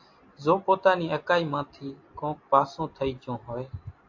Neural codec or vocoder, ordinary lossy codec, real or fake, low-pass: none; AAC, 48 kbps; real; 7.2 kHz